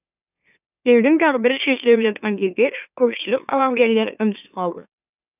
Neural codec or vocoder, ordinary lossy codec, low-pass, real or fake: autoencoder, 44.1 kHz, a latent of 192 numbers a frame, MeloTTS; none; 3.6 kHz; fake